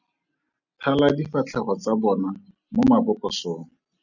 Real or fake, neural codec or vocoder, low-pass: real; none; 7.2 kHz